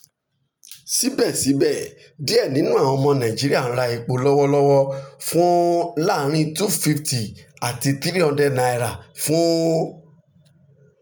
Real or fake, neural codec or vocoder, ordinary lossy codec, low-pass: real; none; none; none